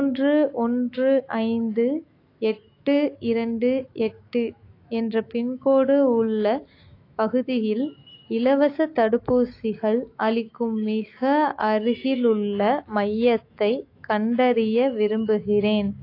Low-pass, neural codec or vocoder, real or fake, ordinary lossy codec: 5.4 kHz; autoencoder, 48 kHz, 128 numbers a frame, DAC-VAE, trained on Japanese speech; fake; AAC, 32 kbps